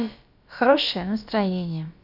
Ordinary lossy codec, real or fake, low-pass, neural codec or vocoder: none; fake; 5.4 kHz; codec, 16 kHz, about 1 kbps, DyCAST, with the encoder's durations